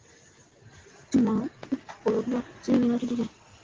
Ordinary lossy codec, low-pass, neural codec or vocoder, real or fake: Opus, 16 kbps; 7.2 kHz; none; real